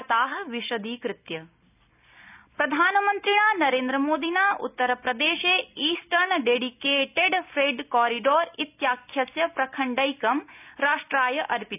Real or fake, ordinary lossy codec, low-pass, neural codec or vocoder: real; none; 3.6 kHz; none